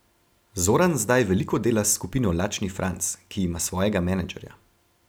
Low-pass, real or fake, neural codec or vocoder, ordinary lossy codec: none; real; none; none